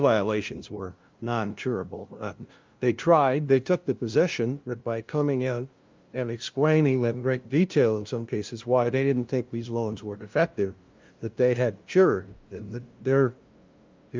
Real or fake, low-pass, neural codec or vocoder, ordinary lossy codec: fake; 7.2 kHz; codec, 16 kHz, 0.5 kbps, FunCodec, trained on LibriTTS, 25 frames a second; Opus, 24 kbps